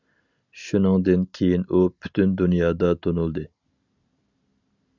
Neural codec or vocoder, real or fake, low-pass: none; real; 7.2 kHz